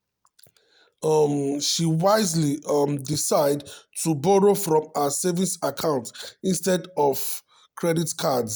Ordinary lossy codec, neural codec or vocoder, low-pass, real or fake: none; none; none; real